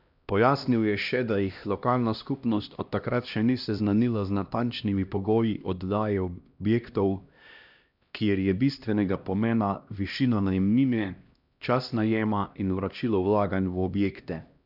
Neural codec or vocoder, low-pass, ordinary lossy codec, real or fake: codec, 16 kHz, 1 kbps, X-Codec, HuBERT features, trained on LibriSpeech; 5.4 kHz; none; fake